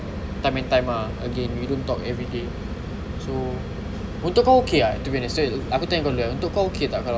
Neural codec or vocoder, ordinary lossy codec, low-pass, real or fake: none; none; none; real